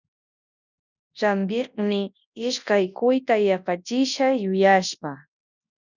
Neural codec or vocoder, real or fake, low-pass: codec, 24 kHz, 0.9 kbps, WavTokenizer, large speech release; fake; 7.2 kHz